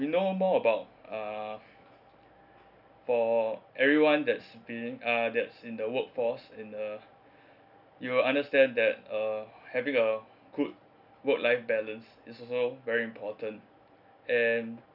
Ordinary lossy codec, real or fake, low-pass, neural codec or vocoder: none; real; 5.4 kHz; none